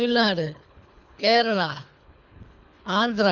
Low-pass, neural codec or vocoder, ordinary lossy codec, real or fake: 7.2 kHz; codec, 24 kHz, 3 kbps, HILCodec; none; fake